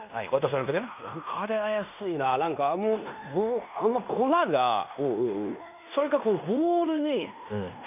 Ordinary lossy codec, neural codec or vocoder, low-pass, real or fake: none; codec, 16 kHz in and 24 kHz out, 0.9 kbps, LongCat-Audio-Codec, fine tuned four codebook decoder; 3.6 kHz; fake